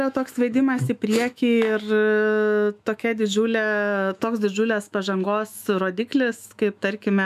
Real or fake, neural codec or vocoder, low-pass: fake; autoencoder, 48 kHz, 128 numbers a frame, DAC-VAE, trained on Japanese speech; 14.4 kHz